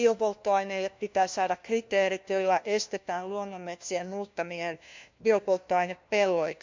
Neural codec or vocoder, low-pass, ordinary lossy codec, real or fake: codec, 16 kHz, 1 kbps, FunCodec, trained on LibriTTS, 50 frames a second; 7.2 kHz; MP3, 64 kbps; fake